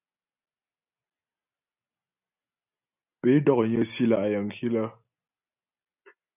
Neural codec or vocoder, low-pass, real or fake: none; 3.6 kHz; real